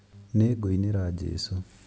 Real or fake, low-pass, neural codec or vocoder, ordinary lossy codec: real; none; none; none